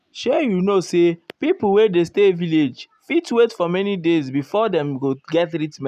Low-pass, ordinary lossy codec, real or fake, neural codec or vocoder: 14.4 kHz; none; real; none